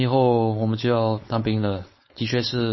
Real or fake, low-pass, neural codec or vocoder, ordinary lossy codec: fake; 7.2 kHz; codec, 16 kHz, 4.8 kbps, FACodec; MP3, 24 kbps